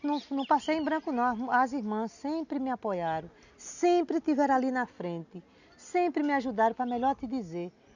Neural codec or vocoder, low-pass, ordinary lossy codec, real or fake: none; 7.2 kHz; none; real